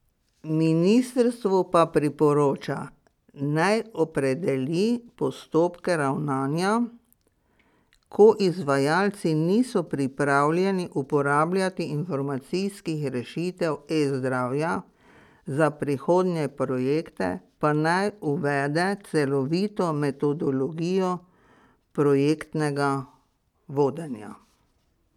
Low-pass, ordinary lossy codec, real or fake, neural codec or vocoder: 19.8 kHz; none; real; none